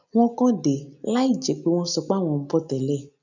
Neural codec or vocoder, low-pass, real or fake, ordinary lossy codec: none; 7.2 kHz; real; none